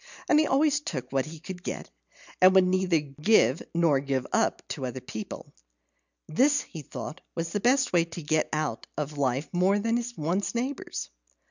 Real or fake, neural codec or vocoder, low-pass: real; none; 7.2 kHz